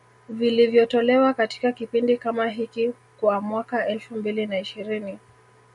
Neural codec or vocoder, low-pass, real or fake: none; 10.8 kHz; real